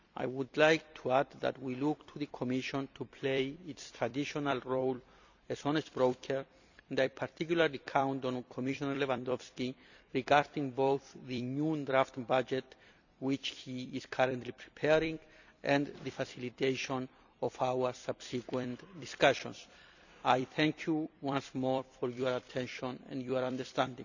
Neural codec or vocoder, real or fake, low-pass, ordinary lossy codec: vocoder, 44.1 kHz, 128 mel bands every 256 samples, BigVGAN v2; fake; 7.2 kHz; none